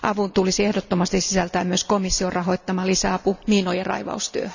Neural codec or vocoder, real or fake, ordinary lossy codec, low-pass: none; real; none; 7.2 kHz